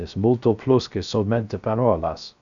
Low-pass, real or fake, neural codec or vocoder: 7.2 kHz; fake; codec, 16 kHz, 0.3 kbps, FocalCodec